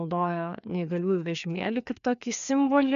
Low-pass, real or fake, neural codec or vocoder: 7.2 kHz; fake; codec, 16 kHz, 2 kbps, FreqCodec, larger model